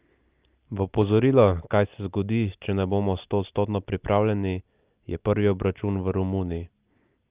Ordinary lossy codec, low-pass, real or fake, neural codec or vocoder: Opus, 32 kbps; 3.6 kHz; real; none